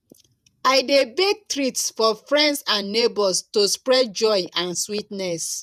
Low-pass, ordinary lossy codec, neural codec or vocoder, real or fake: 14.4 kHz; none; vocoder, 48 kHz, 128 mel bands, Vocos; fake